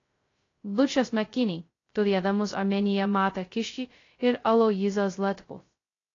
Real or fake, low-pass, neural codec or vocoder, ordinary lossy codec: fake; 7.2 kHz; codec, 16 kHz, 0.2 kbps, FocalCodec; AAC, 32 kbps